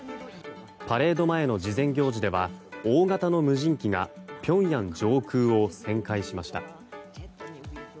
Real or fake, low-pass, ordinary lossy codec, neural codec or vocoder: real; none; none; none